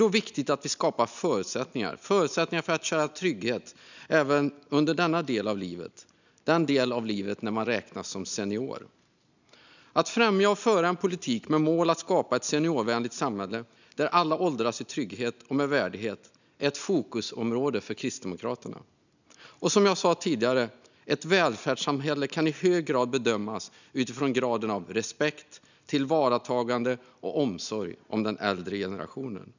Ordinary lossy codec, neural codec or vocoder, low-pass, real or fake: none; none; 7.2 kHz; real